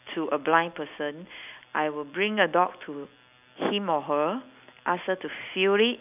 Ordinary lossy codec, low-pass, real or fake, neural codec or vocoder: none; 3.6 kHz; real; none